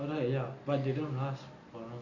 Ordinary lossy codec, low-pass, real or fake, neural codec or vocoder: none; 7.2 kHz; real; none